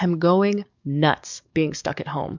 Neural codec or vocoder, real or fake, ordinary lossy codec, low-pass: codec, 44.1 kHz, 7.8 kbps, DAC; fake; MP3, 64 kbps; 7.2 kHz